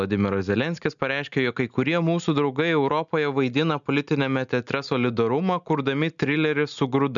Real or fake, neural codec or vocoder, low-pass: real; none; 7.2 kHz